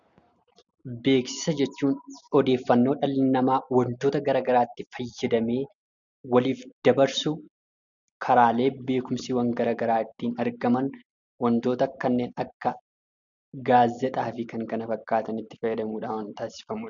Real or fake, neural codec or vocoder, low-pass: real; none; 7.2 kHz